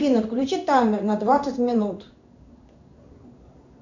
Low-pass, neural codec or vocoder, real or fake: 7.2 kHz; codec, 16 kHz in and 24 kHz out, 1 kbps, XY-Tokenizer; fake